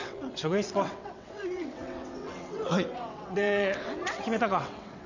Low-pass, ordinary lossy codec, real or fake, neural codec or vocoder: 7.2 kHz; none; fake; vocoder, 22.05 kHz, 80 mel bands, WaveNeXt